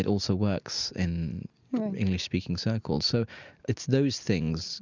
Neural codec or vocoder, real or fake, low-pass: none; real; 7.2 kHz